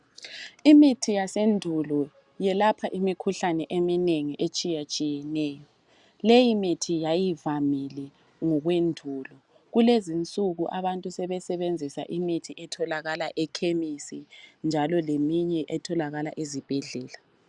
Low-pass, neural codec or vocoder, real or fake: 10.8 kHz; none; real